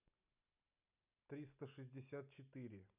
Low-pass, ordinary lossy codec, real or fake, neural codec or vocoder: 3.6 kHz; none; real; none